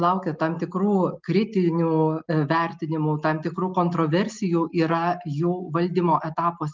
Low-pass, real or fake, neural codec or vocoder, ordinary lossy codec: 7.2 kHz; real; none; Opus, 32 kbps